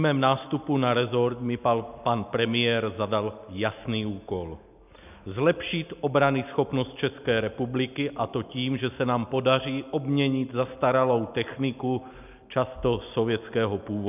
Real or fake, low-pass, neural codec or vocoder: real; 3.6 kHz; none